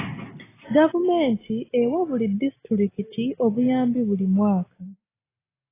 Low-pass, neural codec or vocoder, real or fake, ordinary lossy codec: 3.6 kHz; none; real; AAC, 16 kbps